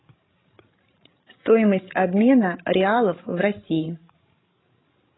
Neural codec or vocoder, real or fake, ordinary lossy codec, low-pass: codec, 16 kHz, 16 kbps, FreqCodec, larger model; fake; AAC, 16 kbps; 7.2 kHz